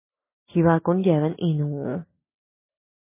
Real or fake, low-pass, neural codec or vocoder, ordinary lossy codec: real; 3.6 kHz; none; MP3, 16 kbps